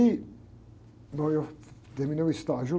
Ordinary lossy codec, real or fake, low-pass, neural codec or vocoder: none; real; none; none